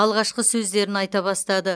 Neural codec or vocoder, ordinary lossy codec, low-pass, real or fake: none; none; none; real